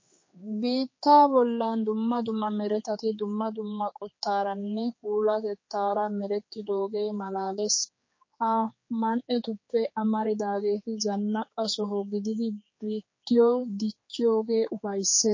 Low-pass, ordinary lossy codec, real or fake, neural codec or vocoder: 7.2 kHz; MP3, 32 kbps; fake; codec, 16 kHz, 4 kbps, X-Codec, HuBERT features, trained on general audio